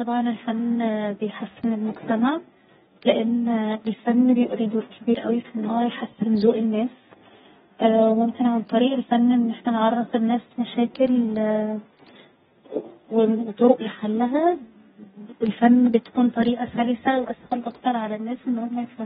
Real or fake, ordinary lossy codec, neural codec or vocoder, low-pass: fake; AAC, 16 kbps; codec, 32 kHz, 1.9 kbps, SNAC; 14.4 kHz